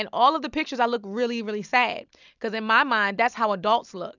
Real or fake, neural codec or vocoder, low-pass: real; none; 7.2 kHz